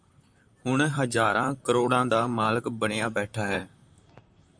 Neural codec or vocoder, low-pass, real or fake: vocoder, 44.1 kHz, 128 mel bands, Pupu-Vocoder; 9.9 kHz; fake